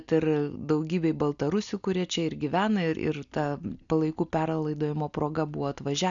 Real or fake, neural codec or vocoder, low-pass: real; none; 7.2 kHz